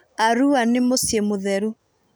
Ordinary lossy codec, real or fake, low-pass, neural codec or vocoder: none; real; none; none